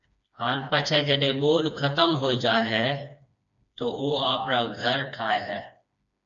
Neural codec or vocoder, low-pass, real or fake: codec, 16 kHz, 2 kbps, FreqCodec, smaller model; 7.2 kHz; fake